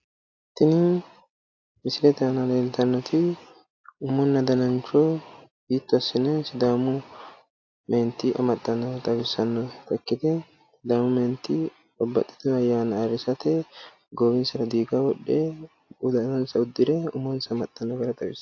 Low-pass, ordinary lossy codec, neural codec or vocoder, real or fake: 7.2 kHz; AAC, 48 kbps; none; real